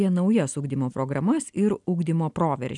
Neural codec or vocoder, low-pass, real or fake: none; 10.8 kHz; real